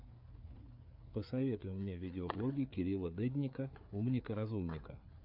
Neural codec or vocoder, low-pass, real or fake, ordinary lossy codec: codec, 16 kHz, 4 kbps, FreqCodec, larger model; 5.4 kHz; fake; MP3, 48 kbps